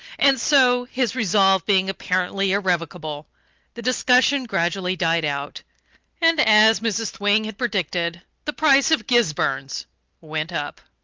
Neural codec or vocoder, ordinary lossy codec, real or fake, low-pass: none; Opus, 32 kbps; real; 7.2 kHz